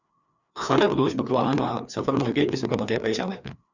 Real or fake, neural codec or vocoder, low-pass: fake; codec, 16 kHz, 2 kbps, FreqCodec, larger model; 7.2 kHz